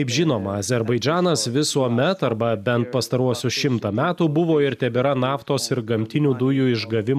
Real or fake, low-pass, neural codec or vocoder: real; 14.4 kHz; none